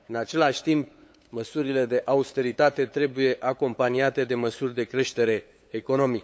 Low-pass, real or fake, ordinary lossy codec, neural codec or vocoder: none; fake; none; codec, 16 kHz, 8 kbps, FunCodec, trained on LibriTTS, 25 frames a second